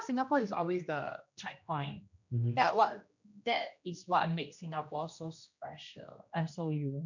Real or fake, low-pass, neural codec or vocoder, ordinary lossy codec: fake; 7.2 kHz; codec, 16 kHz, 1 kbps, X-Codec, HuBERT features, trained on general audio; none